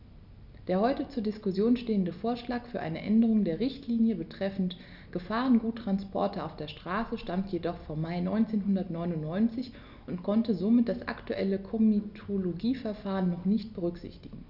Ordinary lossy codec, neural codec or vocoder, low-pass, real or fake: none; none; 5.4 kHz; real